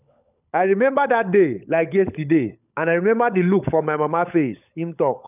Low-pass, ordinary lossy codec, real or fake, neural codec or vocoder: 3.6 kHz; none; fake; codec, 16 kHz, 16 kbps, FunCodec, trained on LibriTTS, 50 frames a second